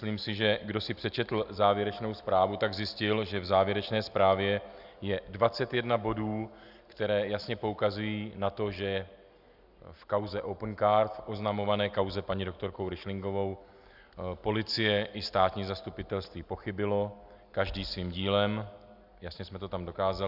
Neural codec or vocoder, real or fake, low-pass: none; real; 5.4 kHz